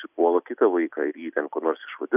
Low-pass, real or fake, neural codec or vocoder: 3.6 kHz; real; none